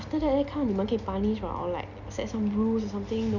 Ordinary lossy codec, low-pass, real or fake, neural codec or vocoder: none; 7.2 kHz; real; none